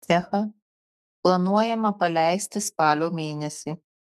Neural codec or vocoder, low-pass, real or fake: codec, 44.1 kHz, 2.6 kbps, SNAC; 14.4 kHz; fake